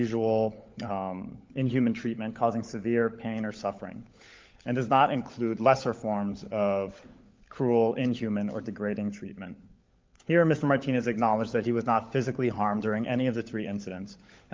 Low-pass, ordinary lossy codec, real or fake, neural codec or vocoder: 7.2 kHz; Opus, 24 kbps; fake; codec, 16 kHz, 16 kbps, FunCodec, trained on LibriTTS, 50 frames a second